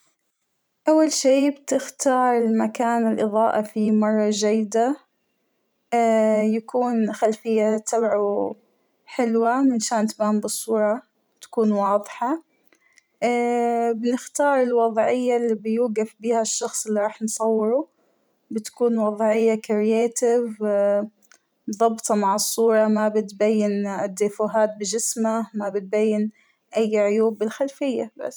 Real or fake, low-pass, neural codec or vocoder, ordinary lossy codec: fake; none; vocoder, 44.1 kHz, 128 mel bands every 512 samples, BigVGAN v2; none